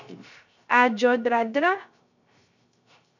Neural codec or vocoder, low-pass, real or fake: codec, 16 kHz, 0.3 kbps, FocalCodec; 7.2 kHz; fake